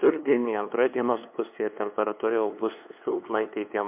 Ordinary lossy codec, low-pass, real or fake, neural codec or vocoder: MP3, 32 kbps; 3.6 kHz; fake; codec, 16 kHz, 2 kbps, FunCodec, trained on LibriTTS, 25 frames a second